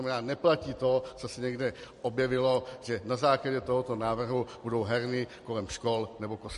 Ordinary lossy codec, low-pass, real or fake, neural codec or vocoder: MP3, 48 kbps; 14.4 kHz; real; none